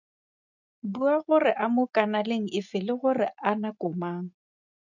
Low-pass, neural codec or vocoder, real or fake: 7.2 kHz; none; real